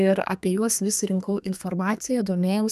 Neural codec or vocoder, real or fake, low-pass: codec, 32 kHz, 1.9 kbps, SNAC; fake; 14.4 kHz